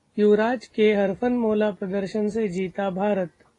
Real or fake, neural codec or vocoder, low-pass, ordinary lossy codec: real; none; 10.8 kHz; AAC, 32 kbps